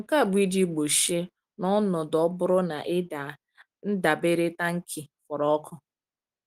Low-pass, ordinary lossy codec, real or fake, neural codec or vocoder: 14.4 kHz; Opus, 24 kbps; real; none